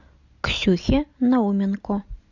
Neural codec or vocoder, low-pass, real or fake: none; 7.2 kHz; real